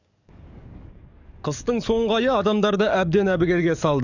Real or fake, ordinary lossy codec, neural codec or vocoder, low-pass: fake; none; codec, 44.1 kHz, 7.8 kbps, DAC; 7.2 kHz